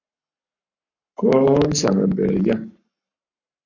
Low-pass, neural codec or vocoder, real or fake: 7.2 kHz; codec, 44.1 kHz, 7.8 kbps, Pupu-Codec; fake